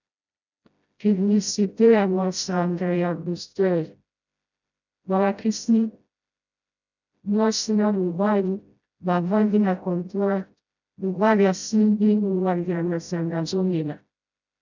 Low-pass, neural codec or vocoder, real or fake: 7.2 kHz; codec, 16 kHz, 0.5 kbps, FreqCodec, smaller model; fake